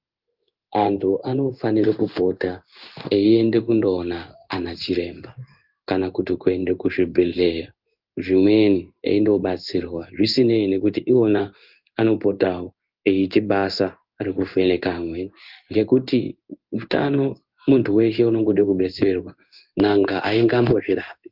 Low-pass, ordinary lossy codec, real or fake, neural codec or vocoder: 5.4 kHz; Opus, 24 kbps; fake; codec, 16 kHz in and 24 kHz out, 1 kbps, XY-Tokenizer